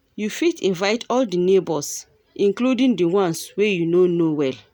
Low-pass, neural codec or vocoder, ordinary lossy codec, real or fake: none; none; none; real